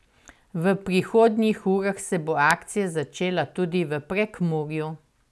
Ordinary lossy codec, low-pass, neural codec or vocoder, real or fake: none; none; none; real